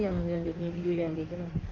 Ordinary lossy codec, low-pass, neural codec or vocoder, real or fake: Opus, 24 kbps; 7.2 kHz; codec, 16 kHz in and 24 kHz out, 1.1 kbps, FireRedTTS-2 codec; fake